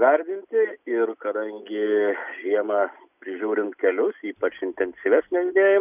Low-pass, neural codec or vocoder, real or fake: 3.6 kHz; none; real